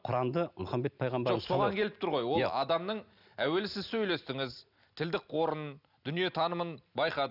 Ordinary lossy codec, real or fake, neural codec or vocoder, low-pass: none; real; none; 5.4 kHz